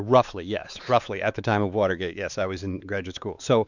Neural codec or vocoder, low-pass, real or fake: codec, 16 kHz, 4 kbps, X-Codec, WavLM features, trained on Multilingual LibriSpeech; 7.2 kHz; fake